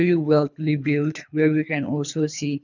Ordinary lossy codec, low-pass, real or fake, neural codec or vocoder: none; 7.2 kHz; fake; codec, 24 kHz, 3 kbps, HILCodec